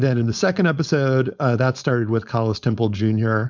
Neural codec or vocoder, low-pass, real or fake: codec, 16 kHz, 4.8 kbps, FACodec; 7.2 kHz; fake